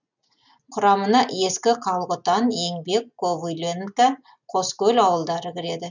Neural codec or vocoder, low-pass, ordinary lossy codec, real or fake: none; 7.2 kHz; none; real